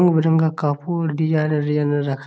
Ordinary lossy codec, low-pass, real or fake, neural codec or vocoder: none; none; real; none